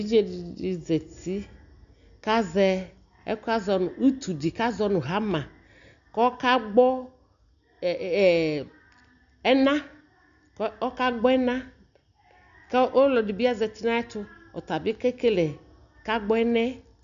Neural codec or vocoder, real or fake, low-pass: none; real; 7.2 kHz